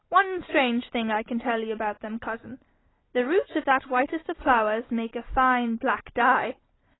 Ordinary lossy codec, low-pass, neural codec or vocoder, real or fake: AAC, 16 kbps; 7.2 kHz; none; real